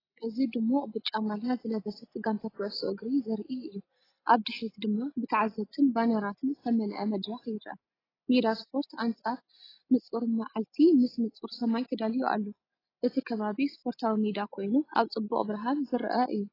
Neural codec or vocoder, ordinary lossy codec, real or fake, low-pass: none; AAC, 24 kbps; real; 5.4 kHz